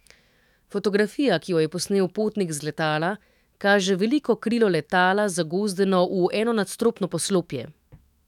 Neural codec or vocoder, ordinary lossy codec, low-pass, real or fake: autoencoder, 48 kHz, 128 numbers a frame, DAC-VAE, trained on Japanese speech; none; 19.8 kHz; fake